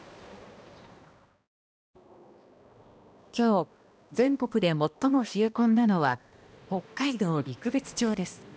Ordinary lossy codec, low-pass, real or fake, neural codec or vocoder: none; none; fake; codec, 16 kHz, 1 kbps, X-Codec, HuBERT features, trained on balanced general audio